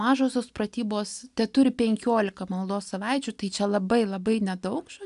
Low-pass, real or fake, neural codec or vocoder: 10.8 kHz; real; none